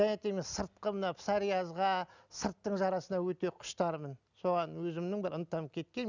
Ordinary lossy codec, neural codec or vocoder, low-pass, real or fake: none; none; 7.2 kHz; real